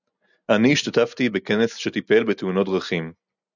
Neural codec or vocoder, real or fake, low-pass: none; real; 7.2 kHz